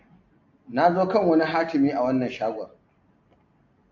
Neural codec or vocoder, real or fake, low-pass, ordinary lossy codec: none; real; 7.2 kHz; AAC, 48 kbps